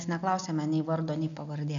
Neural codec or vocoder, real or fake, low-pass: none; real; 7.2 kHz